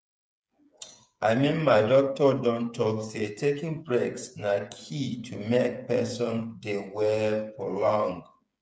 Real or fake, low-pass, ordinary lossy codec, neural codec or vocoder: fake; none; none; codec, 16 kHz, 8 kbps, FreqCodec, smaller model